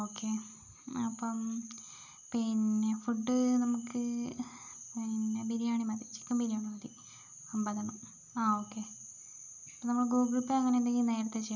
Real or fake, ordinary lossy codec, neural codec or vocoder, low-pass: real; none; none; 7.2 kHz